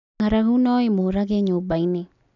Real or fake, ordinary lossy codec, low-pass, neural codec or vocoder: real; none; 7.2 kHz; none